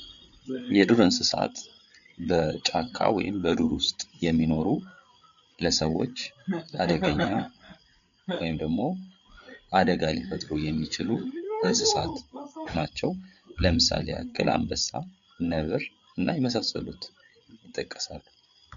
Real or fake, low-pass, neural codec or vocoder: fake; 7.2 kHz; codec, 16 kHz, 8 kbps, FreqCodec, larger model